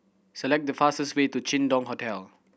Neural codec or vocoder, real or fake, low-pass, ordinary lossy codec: none; real; none; none